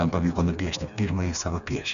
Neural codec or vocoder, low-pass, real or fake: codec, 16 kHz, 2 kbps, FreqCodec, smaller model; 7.2 kHz; fake